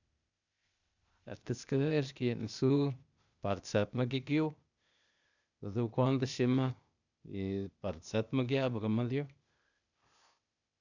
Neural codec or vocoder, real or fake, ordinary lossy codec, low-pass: codec, 16 kHz, 0.8 kbps, ZipCodec; fake; none; 7.2 kHz